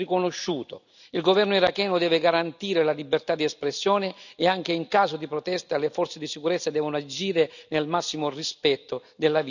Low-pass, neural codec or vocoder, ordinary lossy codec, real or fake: 7.2 kHz; none; none; real